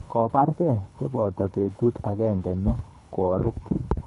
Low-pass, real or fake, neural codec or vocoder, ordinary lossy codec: 10.8 kHz; fake; codec, 24 kHz, 3 kbps, HILCodec; none